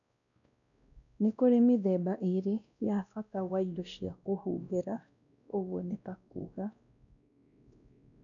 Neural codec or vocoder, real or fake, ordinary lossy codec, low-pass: codec, 16 kHz, 1 kbps, X-Codec, WavLM features, trained on Multilingual LibriSpeech; fake; none; 7.2 kHz